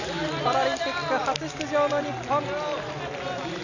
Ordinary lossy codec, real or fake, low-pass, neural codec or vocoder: none; real; 7.2 kHz; none